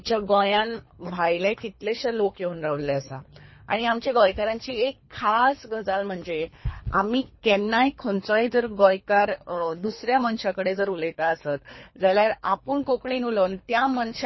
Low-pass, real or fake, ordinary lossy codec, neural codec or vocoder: 7.2 kHz; fake; MP3, 24 kbps; codec, 24 kHz, 3 kbps, HILCodec